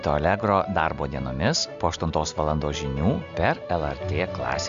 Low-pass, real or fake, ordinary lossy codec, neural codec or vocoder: 7.2 kHz; real; MP3, 64 kbps; none